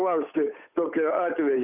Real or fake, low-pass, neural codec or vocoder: fake; 3.6 kHz; codec, 16 kHz, 8 kbps, FunCodec, trained on Chinese and English, 25 frames a second